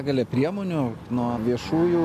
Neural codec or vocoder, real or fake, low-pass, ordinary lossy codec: none; real; 14.4 kHz; MP3, 64 kbps